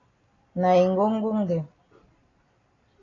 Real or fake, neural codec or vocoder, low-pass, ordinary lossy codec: real; none; 7.2 kHz; AAC, 32 kbps